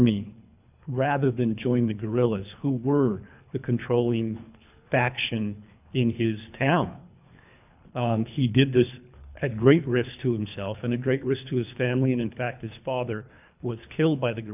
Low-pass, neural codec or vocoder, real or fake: 3.6 kHz; codec, 24 kHz, 3 kbps, HILCodec; fake